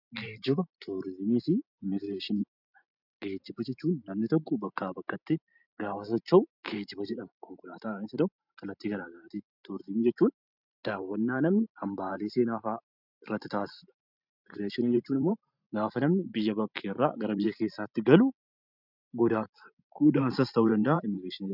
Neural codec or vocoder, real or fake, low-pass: vocoder, 44.1 kHz, 128 mel bands every 512 samples, BigVGAN v2; fake; 5.4 kHz